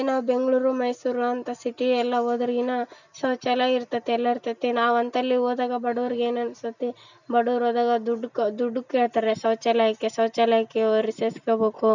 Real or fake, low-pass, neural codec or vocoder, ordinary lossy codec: real; 7.2 kHz; none; none